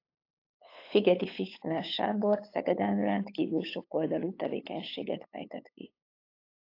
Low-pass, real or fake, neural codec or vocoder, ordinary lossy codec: 5.4 kHz; fake; codec, 16 kHz, 8 kbps, FunCodec, trained on LibriTTS, 25 frames a second; AAC, 32 kbps